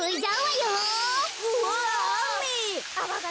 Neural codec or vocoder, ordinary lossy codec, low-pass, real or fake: none; none; none; real